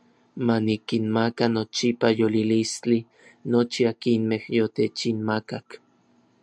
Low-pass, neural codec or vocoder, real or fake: 9.9 kHz; none; real